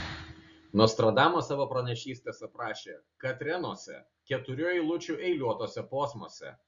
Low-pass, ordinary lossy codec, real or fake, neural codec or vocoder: 7.2 kHz; Opus, 64 kbps; real; none